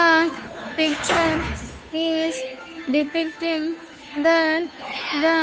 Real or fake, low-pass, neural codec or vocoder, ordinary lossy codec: fake; none; codec, 16 kHz, 2 kbps, FunCodec, trained on Chinese and English, 25 frames a second; none